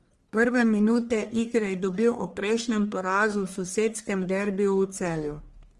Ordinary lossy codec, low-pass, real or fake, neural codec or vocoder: Opus, 24 kbps; 10.8 kHz; fake; codec, 44.1 kHz, 1.7 kbps, Pupu-Codec